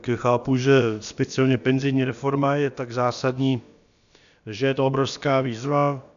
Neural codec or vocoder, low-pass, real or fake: codec, 16 kHz, about 1 kbps, DyCAST, with the encoder's durations; 7.2 kHz; fake